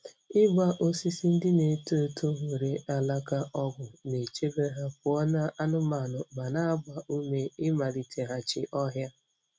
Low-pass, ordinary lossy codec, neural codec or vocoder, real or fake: none; none; none; real